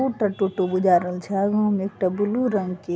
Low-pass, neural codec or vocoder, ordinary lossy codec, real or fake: none; none; none; real